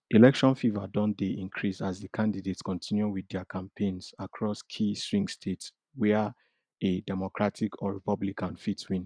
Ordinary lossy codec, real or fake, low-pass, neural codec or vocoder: none; real; 9.9 kHz; none